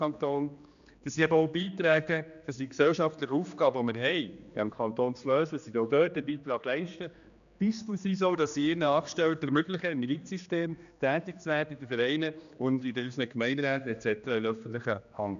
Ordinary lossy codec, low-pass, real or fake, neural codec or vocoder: none; 7.2 kHz; fake; codec, 16 kHz, 2 kbps, X-Codec, HuBERT features, trained on general audio